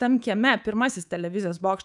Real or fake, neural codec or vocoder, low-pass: fake; codec, 24 kHz, 3.1 kbps, DualCodec; 10.8 kHz